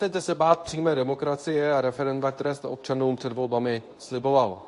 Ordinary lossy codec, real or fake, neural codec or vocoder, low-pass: AAC, 48 kbps; fake; codec, 24 kHz, 0.9 kbps, WavTokenizer, medium speech release version 2; 10.8 kHz